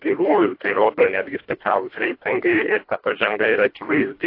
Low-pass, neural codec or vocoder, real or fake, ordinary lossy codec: 5.4 kHz; codec, 24 kHz, 1.5 kbps, HILCodec; fake; AAC, 32 kbps